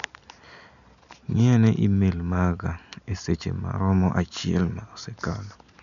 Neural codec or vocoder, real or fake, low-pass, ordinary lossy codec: none; real; 7.2 kHz; none